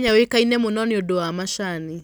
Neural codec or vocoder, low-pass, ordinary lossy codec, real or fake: none; none; none; real